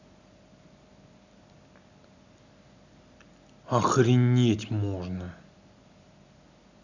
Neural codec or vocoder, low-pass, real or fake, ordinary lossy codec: none; 7.2 kHz; real; none